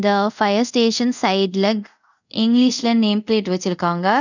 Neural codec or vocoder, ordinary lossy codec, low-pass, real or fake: codec, 24 kHz, 0.5 kbps, DualCodec; none; 7.2 kHz; fake